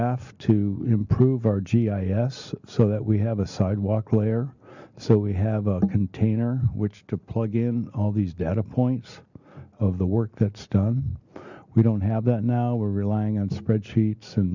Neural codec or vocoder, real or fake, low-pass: none; real; 7.2 kHz